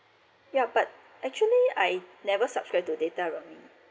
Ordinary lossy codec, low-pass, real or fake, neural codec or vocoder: none; none; real; none